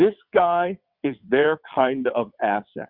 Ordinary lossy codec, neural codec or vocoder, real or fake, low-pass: Opus, 64 kbps; vocoder, 22.05 kHz, 80 mel bands, WaveNeXt; fake; 5.4 kHz